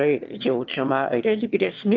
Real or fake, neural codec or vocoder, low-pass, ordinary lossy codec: fake; autoencoder, 22.05 kHz, a latent of 192 numbers a frame, VITS, trained on one speaker; 7.2 kHz; Opus, 32 kbps